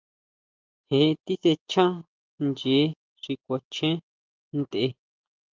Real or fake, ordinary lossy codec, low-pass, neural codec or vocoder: real; Opus, 24 kbps; 7.2 kHz; none